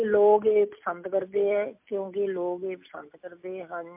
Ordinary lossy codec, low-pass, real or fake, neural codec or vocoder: MP3, 32 kbps; 3.6 kHz; real; none